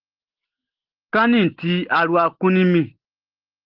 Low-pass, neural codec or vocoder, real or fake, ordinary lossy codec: 5.4 kHz; none; real; Opus, 16 kbps